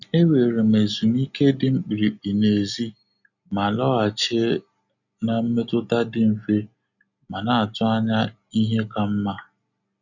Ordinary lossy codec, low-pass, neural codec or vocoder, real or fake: none; 7.2 kHz; none; real